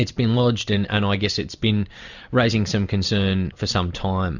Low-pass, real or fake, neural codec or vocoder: 7.2 kHz; real; none